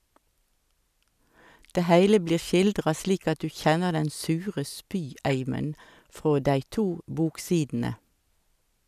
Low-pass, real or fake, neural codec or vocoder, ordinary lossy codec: 14.4 kHz; real; none; none